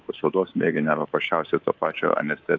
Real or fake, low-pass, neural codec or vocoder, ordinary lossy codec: fake; 7.2 kHz; codec, 24 kHz, 3.1 kbps, DualCodec; AAC, 48 kbps